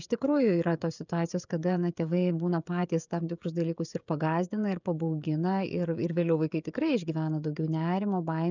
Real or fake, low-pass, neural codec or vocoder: fake; 7.2 kHz; codec, 16 kHz, 16 kbps, FreqCodec, smaller model